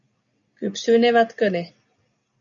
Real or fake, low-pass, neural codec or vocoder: real; 7.2 kHz; none